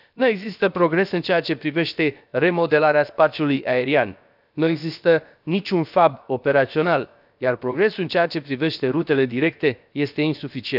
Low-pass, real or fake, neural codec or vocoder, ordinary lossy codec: 5.4 kHz; fake; codec, 16 kHz, about 1 kbps, DyCAST, with the encoder's durations; none